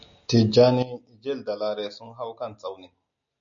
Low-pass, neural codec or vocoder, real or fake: 7.2 kHz; none; real